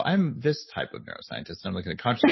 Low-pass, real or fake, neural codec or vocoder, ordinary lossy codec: 7.2 kHz; real; none; MP3, 24 kbps